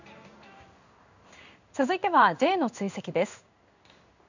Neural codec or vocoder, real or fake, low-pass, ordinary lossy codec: codec, 16 kHz in and 24 kHz out, 1 kbps, XY-Tokenizer; fake; 7.2 kHz; none